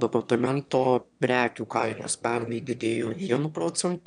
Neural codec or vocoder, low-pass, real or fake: autoencoder, 22.05 kHz, a latent of 192 numbers a frame, VITS, trained on one speaker; 9.9 kHz; fake